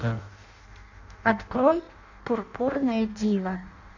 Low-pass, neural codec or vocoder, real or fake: 7.2 kHz; codec, 16 kHz in and 24 kHz out, 0.6 kbps, FireRedTTS-2 codec; fake